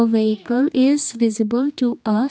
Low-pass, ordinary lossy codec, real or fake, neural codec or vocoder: none; none; fake; codec, 16 kHz, 2 kbps, X-Codec, HuBERT features, trained on general audio